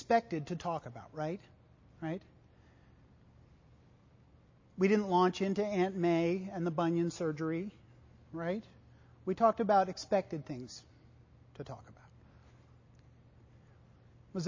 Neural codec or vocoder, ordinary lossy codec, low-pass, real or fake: none; MP3, 32 kbps; 7.2 kHz; real